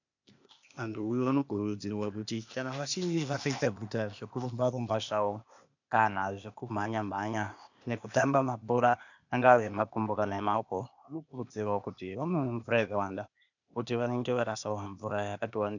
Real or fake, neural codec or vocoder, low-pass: fake; codec, 16 kHz, 0.8 kbps, ZipCodec; 7.2 kHz